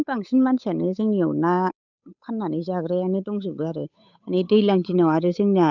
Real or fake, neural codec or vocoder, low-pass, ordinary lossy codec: fake; codec, 16 kHz, 8 kbps, FunCodec, trained on Chinese and English, 25 frames a second; 7.2 kHz; none